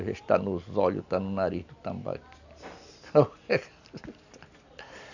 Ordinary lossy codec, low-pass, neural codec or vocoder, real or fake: none; 7.2 kHz; none; real